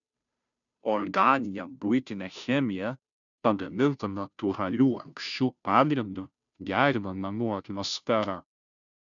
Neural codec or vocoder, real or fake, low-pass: codec, 16 kHz, 0.5 kbps, FunCodec, trained on Chinese and English, 25 frames a second; fake; 7.2 kHz